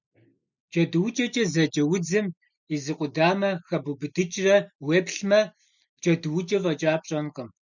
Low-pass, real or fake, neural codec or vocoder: 7.2 kHz; real; none